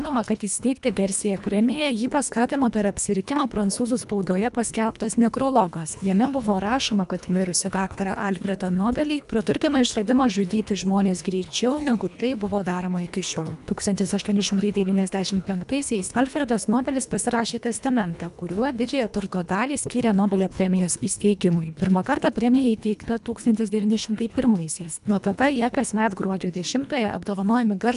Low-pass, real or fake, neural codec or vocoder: 10.8 kHz; fake; codec, 24 kHz, 1.5 kbps, HILCodec